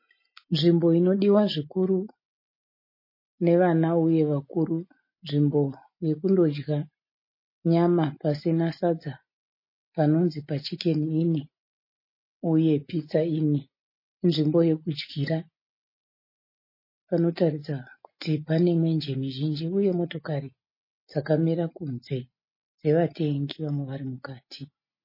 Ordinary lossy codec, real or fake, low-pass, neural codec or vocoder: MP3, 24 kbps; real; 5.4 kHz; none